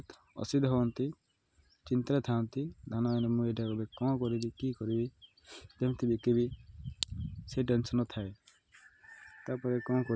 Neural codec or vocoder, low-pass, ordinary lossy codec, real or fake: none; none; none; real